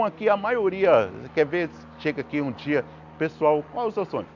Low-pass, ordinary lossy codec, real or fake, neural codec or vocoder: 7.2 kHz; none; real; none